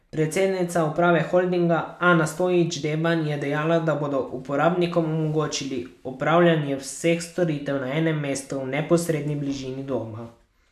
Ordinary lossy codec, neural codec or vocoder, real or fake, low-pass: none; none; real; 14.4 kHz